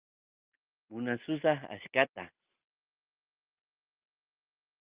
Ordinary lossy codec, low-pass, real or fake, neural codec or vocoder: Opus, 24 kbps; 3.6 kHz; real; none